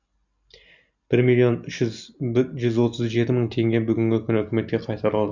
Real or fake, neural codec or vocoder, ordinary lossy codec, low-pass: real; none; none; 7.2 kHz